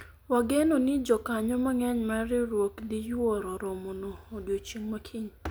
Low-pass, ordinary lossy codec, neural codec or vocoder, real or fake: none; none; none; real